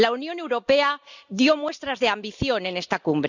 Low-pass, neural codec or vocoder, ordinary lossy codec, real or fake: 7.2 kHz; none; none; real